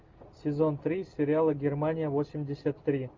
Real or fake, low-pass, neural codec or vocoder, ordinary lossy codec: real; 7.2 kHz; none; Opus, 32 kbps